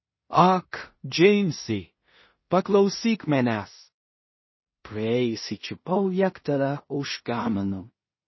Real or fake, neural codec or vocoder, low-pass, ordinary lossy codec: fake; codec, 16 kHz in and 24 kHz out, 0.4 kbps, LongCat-Audio-Codec, two codebook decoder; 7.2 kHz; MP3, 24 kbps